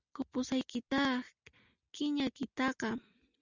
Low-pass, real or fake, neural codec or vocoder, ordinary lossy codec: 7.2 kHz; real; none; Opus, 64 kbps